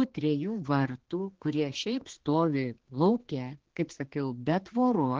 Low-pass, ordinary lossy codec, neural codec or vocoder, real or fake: 7.2 kHz; Opus, 16 kbps; codec, 16 kHz, 2 kbps, X-Codec, HuBERT features, trained on general audio; fake